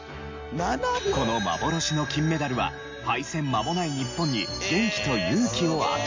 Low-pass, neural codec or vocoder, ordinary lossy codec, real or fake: 7.2 kHz; none; MP3, 48 kbps; real